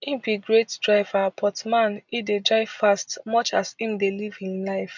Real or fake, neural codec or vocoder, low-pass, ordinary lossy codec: real; none; 7.2 kHz; none